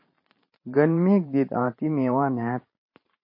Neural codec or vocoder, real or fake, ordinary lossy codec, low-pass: vocoder, 44.1 kHz, 128 mel bands every 512 samples, BigVGAN v2; fake; MP3, 24 kbps; 5.4 kHz